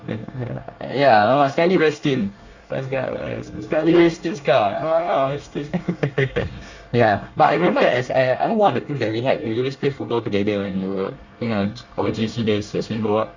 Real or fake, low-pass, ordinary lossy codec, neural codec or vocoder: fake; 7.2 kHz; Opus, 64 kbps; codec, 24 kHz, 1 kbps, SNAC